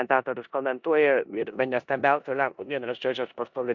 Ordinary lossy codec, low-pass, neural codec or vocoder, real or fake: MP3, 64 kbps; 7.2 kHz; codec, 16 kHz in and 24 kHz out, 0.9 kbps, LongCat-Audio-Codec, four codebook decoder; fake